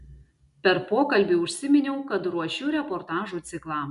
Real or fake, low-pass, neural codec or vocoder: real; 10.8 kHz; none